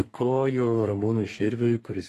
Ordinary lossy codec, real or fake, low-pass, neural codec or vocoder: AAC, 48 kbps; fake; 14.4 kHz; codec, 32 kHz, 1.9 kbps, SNAC